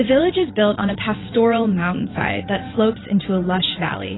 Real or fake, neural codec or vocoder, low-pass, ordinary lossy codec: fake; vocoder, 22.05 kHz, 80 mel bands, WaveNeXt; 7.2 kHz; AAC, 16 kbps